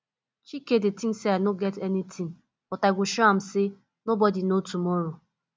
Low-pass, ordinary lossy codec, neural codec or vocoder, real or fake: none; none; none; real